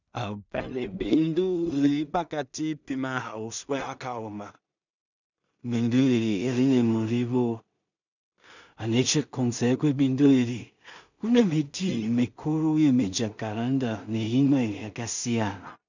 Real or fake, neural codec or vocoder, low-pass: fake; codec, 16 kHz in and 24 kHz out, 0.4 kbps, LongCat-Audio-Codec, two codebook decoder; 7.2 kHz